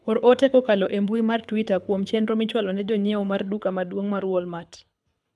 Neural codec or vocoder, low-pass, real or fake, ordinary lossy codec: codec, 24 kHz, 6 kbps, HILCodec; none; fake; none